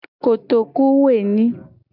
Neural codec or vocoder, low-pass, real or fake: none; 5.4 kHz; real